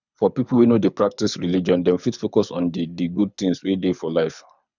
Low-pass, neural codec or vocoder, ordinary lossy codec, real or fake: 7.2 kHz; codec, 24 kHz, 6 kbps, HILCodec; none; fake